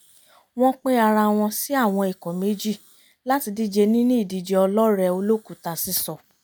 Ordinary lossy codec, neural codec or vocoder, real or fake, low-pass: none; none; real; none